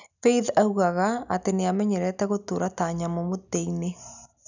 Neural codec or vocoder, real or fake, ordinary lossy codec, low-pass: none; real; none; 7.2 kHz